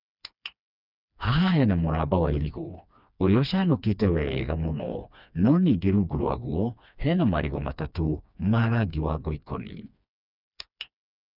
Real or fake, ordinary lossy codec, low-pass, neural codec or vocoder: fake; none; 5.4 kHz; codec, 16 kHz, 2 kbps, FreqCodec, smaller model